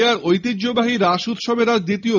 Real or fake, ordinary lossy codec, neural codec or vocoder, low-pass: real; none; none; 7.2 kHz